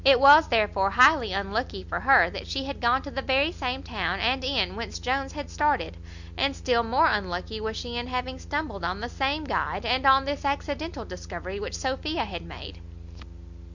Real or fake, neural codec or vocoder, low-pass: real; none; 7.2 kHz